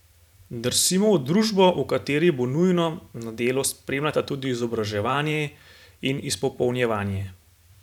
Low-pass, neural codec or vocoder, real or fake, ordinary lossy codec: 19.8 kHz; vocoder, 44.1 kHz, 128 mel bands every 512 samples, BigVGAN v2; fake; none